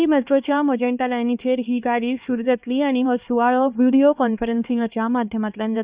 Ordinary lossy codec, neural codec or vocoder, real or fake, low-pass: Opus, 64 kbps; codec, 16 kHz, 2 kbps, X-Codec, HuBERT features, trained on LibriSpeech; fake; 3.6 kHz